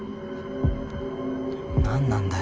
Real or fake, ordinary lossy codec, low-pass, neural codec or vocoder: real; none; none; none